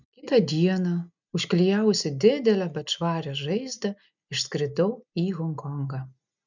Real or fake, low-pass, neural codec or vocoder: real; 7.2 kHz; none